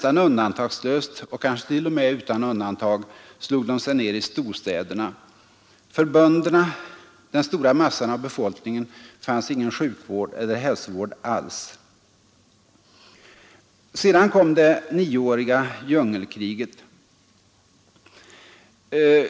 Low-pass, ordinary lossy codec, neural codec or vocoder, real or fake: none; none; none; real